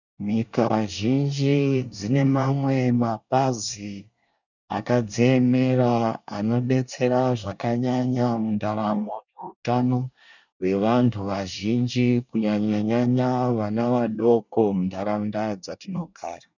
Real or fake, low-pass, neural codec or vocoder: fake; 7.2 kHz; codec, 24 kHz, 1 kbps, SNAC